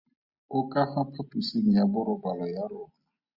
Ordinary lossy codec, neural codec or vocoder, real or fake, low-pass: Opus, 64 kbps; none; real; 5.4 kHz